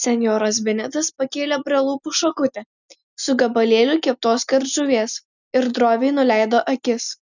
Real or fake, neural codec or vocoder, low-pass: real; none; 7.2 kHz